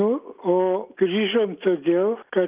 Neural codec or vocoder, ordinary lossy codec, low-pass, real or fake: none; Opus, 24 kbps; 3.6 kHz; real